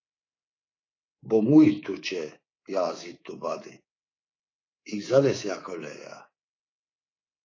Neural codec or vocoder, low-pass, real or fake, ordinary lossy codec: codec, 24 kHz, 3.1 kbps, DualCodec; 7.2 kHz; fake; AAC, 32 kbps